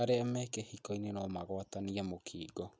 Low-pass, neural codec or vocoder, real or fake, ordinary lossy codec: none; none; real; none